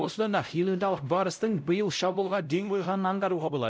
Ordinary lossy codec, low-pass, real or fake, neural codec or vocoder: none; none; fake; codec, 16 kHz, 0.5 kbps, X-Codec, WavLM features, trained on Multilingual LibriSpeech